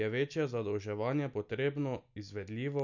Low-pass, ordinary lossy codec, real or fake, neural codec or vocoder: 7.2 kHz; none; real; none